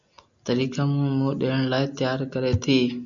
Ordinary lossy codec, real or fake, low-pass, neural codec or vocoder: MP3, 96 kbps; real; 7.2 kHz; none